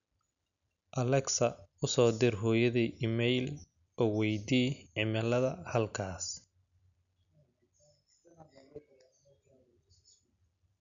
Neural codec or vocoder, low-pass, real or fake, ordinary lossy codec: none; 7.2 kHz; real; MP3, 96 kbps